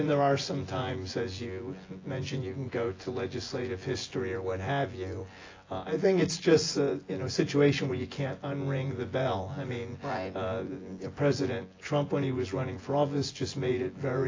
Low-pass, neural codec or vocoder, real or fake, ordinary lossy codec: 7.2 kHz; vocoder, 24 kHz, 100 mel bands, Vocos; fake; AAC, 32 kbps